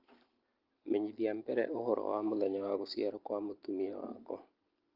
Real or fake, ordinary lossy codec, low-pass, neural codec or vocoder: real; Opus, 32 kbps; 5.4 kHz; none